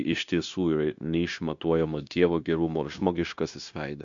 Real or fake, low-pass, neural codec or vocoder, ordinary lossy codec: fake; 7.2 kHz; codec, 16 kHz, 0.9 kbps, LongCat-Audio-Codec; MP3, 64 kbps